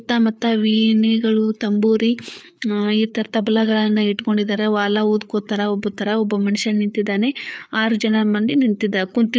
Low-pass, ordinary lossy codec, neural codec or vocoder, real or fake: none; none; codec, 16 kHz, 4 kbps, FreqCodec, larger model; fake